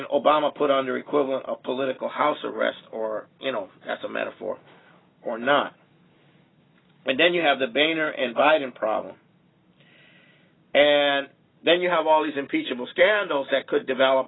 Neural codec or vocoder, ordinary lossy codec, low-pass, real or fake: none; AAC, 16 kbps; 7.2 kHz; real